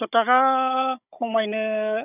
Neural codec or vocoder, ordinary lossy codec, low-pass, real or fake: codec, 16 kHz, 16 kbps, FunCodec, trained on Chinese and English, 50 frames a second; none; 3.6 kHz; fake